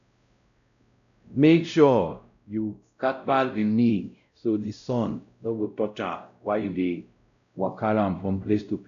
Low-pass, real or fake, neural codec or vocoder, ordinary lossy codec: 7.2 kHz; fake; codec, 16 kHz, 0.5 kbps, X-Codec, WavLM features, trained on Multilingual LibriSpeech; none